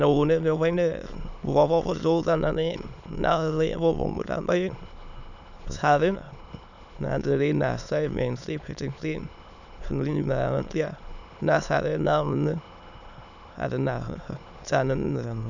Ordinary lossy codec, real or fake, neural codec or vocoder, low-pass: none; fake; autoencoder, 22.05 kHz, a latent of 192 numbers a frame, VITS, trained on many speakers; 7.2 kHz